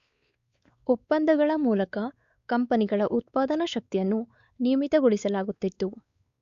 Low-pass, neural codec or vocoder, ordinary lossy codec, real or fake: 7.2 kHz; codec, 16 kHz, 4 kbps, X-Codec, WavLM features, trained on Multilingual LibriSpeech; Opus, 64 kbps; fake